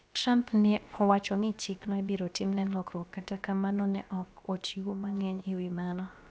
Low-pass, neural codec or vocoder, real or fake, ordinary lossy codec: none; codec, 16 kHz, about 1 kbps, DyCAST, with the encoder's durations; fake; none